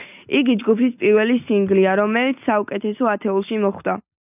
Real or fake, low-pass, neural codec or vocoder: real; 3.6 kHz; none